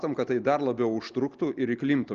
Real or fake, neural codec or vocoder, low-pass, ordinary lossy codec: real; none; 7.2 kHz; Opus, 32 kbps